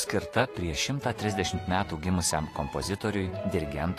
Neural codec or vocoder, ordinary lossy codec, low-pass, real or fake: vocoder, 48 kHz, 128 mel bands, Vocos; AAC, 48 kbps; 14.4 kHz; fake